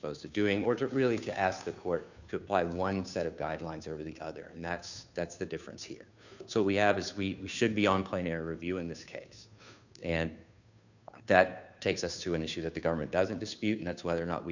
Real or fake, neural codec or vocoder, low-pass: fake; codec, 16 kHz, 2 kbps, FunCodec, trained on Chinese and English, 25 frames a second; 7.2 kHz